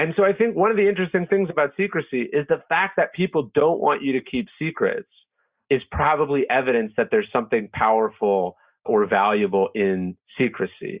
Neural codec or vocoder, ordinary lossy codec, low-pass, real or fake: none; Opus, 64 kbps; 3.6 kHz; real